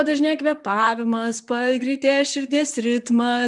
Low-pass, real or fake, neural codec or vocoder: 10.8 kHz; fake; vocoder, 44.1 kHz, 128 mel bands, Pupu-Vocoder